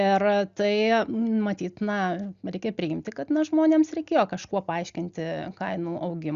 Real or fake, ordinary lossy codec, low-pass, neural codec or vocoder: real; Opus, 64 kbps; 7.2 kHz; none